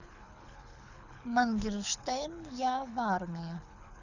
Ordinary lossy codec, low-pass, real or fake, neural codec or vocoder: none; 7.2 kHz; fake; codec, 24 kHz, 6 kbps, HILCodec